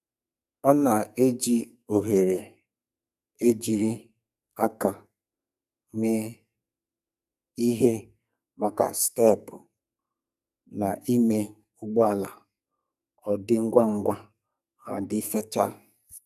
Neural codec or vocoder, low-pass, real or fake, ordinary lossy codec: codec, 44.1 kHz, 2.6 kbps, SNAC; 14.4 kHz; fake; none